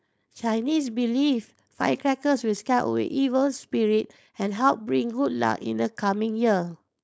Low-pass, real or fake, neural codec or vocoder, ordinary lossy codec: none; fake; codec, 16 kHz, 4.8 kbps, FACodec; none